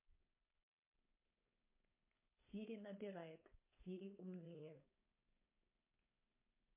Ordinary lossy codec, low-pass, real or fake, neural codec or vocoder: MP3, 24 kbps; 3.6 kHz; fake; codec, 16 kHz, 4.8 kbps, FACodec